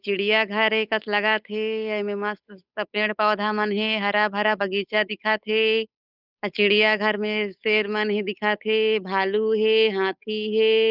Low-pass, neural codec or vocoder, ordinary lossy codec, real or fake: 5.4 kHz; codec, 16 kHz, 8 kbps, FunCodec, trained on Chinese and English, 25 frames a second; none; fake